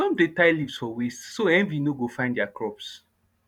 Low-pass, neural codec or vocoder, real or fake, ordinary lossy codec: 19.8 kHz; vocoder, 44.1 kHz, 128 mel bands every 512 samples, BigVGAN v2; fake; none